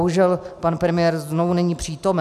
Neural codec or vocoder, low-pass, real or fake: none; 14.4 kHz; real